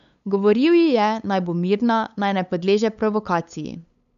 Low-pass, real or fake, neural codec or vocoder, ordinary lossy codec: 7.2 kHz; fake; codec, 16 kHz, 8 kbps, FunCodec, trained on LibriTTS, 25 frames a second; none